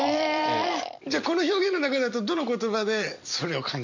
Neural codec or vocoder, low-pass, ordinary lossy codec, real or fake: vocoder, 44.1 kHz, 128 mel bands every 256 samples, BigVGAN v2; 7.2 kHz; MP3, 48 kbps; fake